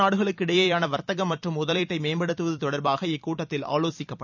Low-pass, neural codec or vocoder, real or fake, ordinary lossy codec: 7.2 kHz; vocoder, 44.1 kHz, 128 mel bands every 256 samples, BigVGAN v2; fake; none